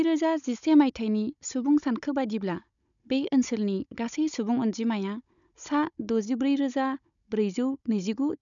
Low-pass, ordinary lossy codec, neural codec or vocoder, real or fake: 7.2 kHz; none; codec, 16 kHz, 16 kbps, FunCodec, trained on Chinese and English, 50 frames a second; fake